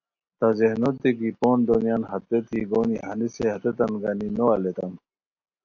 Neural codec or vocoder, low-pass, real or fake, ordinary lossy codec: none; 7.2 kHz; real; AAC, 48 kbps